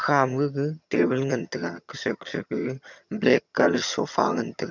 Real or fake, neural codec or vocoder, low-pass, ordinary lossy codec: fake; vocoder, 22.05 kHz, 80 mel bands, HiFi-GAN; 7.2 kHz; none